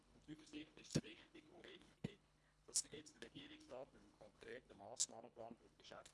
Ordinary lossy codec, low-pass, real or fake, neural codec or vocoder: none; none; fake; codec, 24 kHz, 1.5 kbps, HILCodec